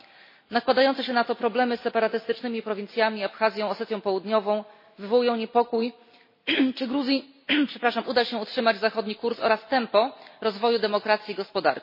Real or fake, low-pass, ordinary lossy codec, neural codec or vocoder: real; 5.4 kHz; MP3, 24 kbps; none